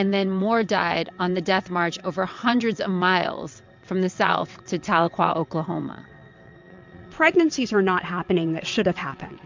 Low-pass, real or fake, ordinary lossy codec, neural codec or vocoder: 7.2 kHz; fake; MP3, 64 kbps; vocoder, 22.05 kHz, 80 mel bands, WaveNeXt